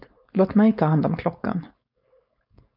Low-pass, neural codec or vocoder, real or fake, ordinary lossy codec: 5.4 kHz; codec, 16 kHz, 4.8 kbps, FACodec; fake; AAC, 48 kbps